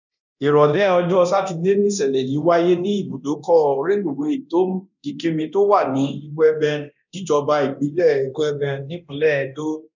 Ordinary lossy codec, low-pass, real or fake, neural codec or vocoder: none; 7.2 kHz; fake; codec, 24 kHz, 0.9 kbps, DualCodec